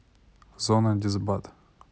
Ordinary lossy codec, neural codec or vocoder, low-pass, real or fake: none; none; none; real